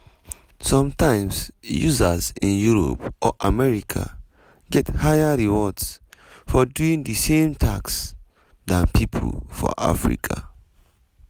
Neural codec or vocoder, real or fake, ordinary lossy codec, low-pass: none; real; none; none